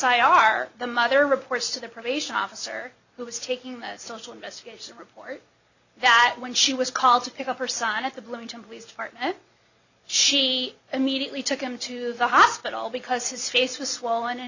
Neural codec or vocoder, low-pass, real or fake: none; 7.2 kHz; real